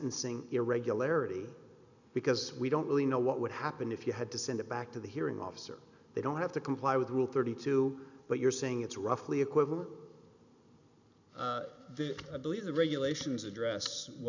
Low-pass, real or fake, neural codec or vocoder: 7.2 kHz; real; none